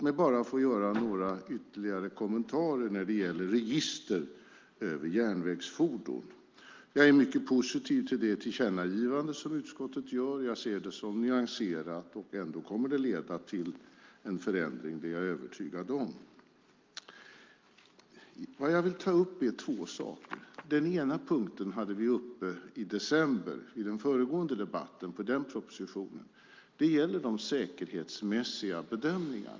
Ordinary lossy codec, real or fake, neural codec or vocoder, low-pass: Opus, 24 kbps; real; none; 7.2 kHz